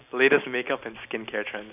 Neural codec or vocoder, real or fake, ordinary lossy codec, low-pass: none; real; none; 3.6 kHz